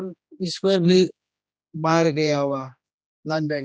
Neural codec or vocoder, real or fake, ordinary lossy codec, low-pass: codec, 16 kHz, 1 kbps, X-Codec, HuBERT features, trained on general audio; fake; none; none